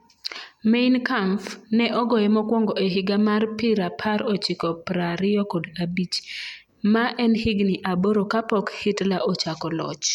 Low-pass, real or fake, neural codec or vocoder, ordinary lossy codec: 19.8 kHz; real; none; MP3, 96 kbps